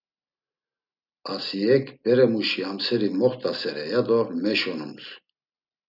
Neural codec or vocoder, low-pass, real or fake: none; 5.4 kHz; real